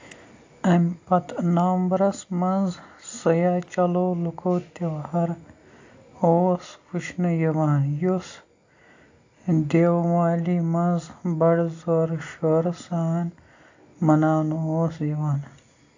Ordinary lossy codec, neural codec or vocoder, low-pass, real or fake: AAC, 48 kbps; none; 7.2 kHz; real